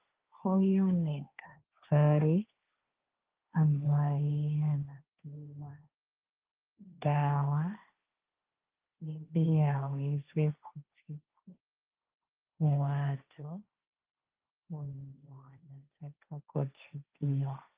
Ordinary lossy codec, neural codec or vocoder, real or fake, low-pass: Opus, 32 kbps; codec, 16 kHz, 1.1 kbps, Voila-Tokenizer; fake; 3.6 kHz